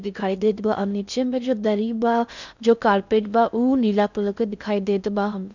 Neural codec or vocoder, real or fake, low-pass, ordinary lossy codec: codec, 16 kHz in and 24 kHz out, 0.6 kbps, FocalCodec, streaming, 4096 codes; fake; 7.2 kHz; none